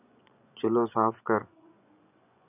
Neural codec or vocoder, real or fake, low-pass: vocoder, 24 kHz, 100 mel bands, Vocos; fake; 3.6 kHz